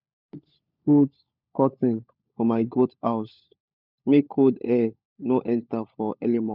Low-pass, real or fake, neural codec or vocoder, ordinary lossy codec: 5.4 kHz; fake; codec, 16 kHz, 16 kbps, FunCodec, trained on LibriTTS, 50 frames a second; none